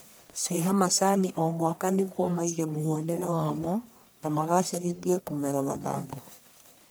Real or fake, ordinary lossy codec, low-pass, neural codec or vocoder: fake; none; none; codec, 44.1 kHz, 1.7 kbps, Pupu-Codec